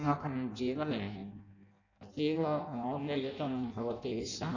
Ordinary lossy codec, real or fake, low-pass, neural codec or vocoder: none; fake; 7.2 kHz; codec, 16 kHz in and 24 kHz out, 0.6 kbps, FireRedTTS-2 codec